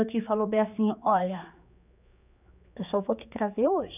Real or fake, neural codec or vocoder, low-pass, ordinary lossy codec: fake; codec, 16 kHz, 4 kbps, X-Codec, HuBERT features, trained on general audio; 3.6 kHz; none